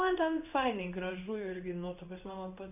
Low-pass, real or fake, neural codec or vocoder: 3.6 kHz; fake; codec, 16 kHz in and 24 kHz out, 1 kbps, XY-Tokenizer